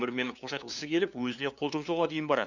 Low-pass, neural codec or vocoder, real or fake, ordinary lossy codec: 7.2 kHz; codec, 16 kHz, 2 kbps, FunCodec, trained on LibriTTS, 25 frames a second; fake; none